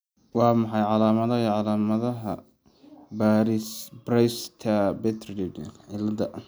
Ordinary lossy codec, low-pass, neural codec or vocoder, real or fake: none; none; none; real